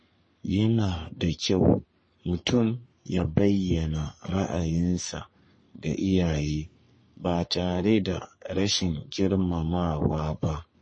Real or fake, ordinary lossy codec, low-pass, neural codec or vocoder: fake; MP3, 32 kbps; 9.9 kHz; codec, 44.1 kHz, 3.4 kbps, Pupu-Codec